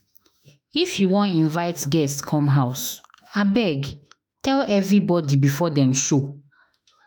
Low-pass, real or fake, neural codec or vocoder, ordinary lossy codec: none; fake; autoencoder, 48 kHz, 32 numbers a frame, DAC-VAE, trained on Japanese speech; none